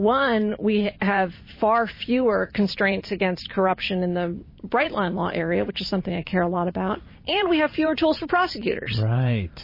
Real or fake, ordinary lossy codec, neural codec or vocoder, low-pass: fake; MP3, 24 kbps; vocoder, 44.1 kHz, 128 mel bands every 512 samples, BigVGAN v2; 5.4 kHz